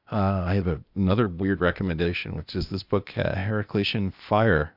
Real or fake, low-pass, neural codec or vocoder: fake; 5.4 kHz; codec, 16 kHz, 0.8 kbps, ZipCodec